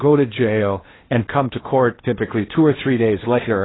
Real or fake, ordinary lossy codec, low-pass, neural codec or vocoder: fake; AAC, 16 kbps; 7.2 kHz; codec, 16 kHz in and 24 kHz out, 0.8 kbps, FocalCodec, streaming, 65536 codes